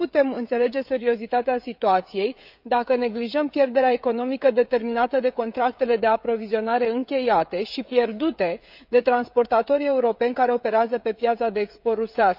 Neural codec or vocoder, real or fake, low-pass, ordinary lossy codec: codec, 16 kHz, 16 kbps, FreqCodec, smaller model; fake; 5.4 kHz; none